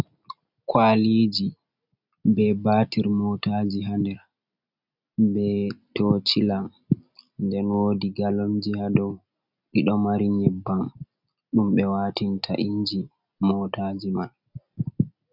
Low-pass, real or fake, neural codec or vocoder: 5.4 kHz; real; none